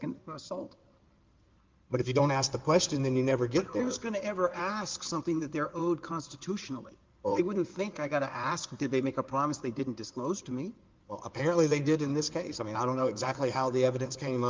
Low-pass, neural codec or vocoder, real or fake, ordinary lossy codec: 7.2 kHz; codec, 16 kHz in and 24 kHz out, 2.2 kbps, FireRedTTS-2 codec; fake; Opus, 32 kbps